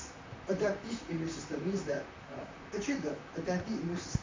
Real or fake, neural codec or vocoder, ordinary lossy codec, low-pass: fake; vocoder, 44.1 kHz, 128 mel bands, Pupu-Vocoder; MP3, 64 kbps; 7.2 kHz